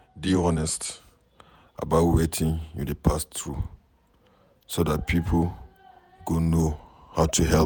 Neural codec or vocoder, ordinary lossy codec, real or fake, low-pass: vocoder, 48 kHz, 128 mel bands, Vocos; none; fake; none